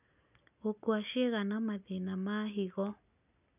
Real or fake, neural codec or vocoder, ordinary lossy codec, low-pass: real; none; none; 3.6 kHz